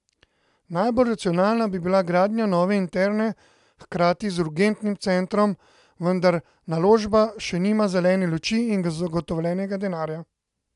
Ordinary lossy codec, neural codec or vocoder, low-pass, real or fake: AAC, 96 kbps; none; 10.8 kHz; real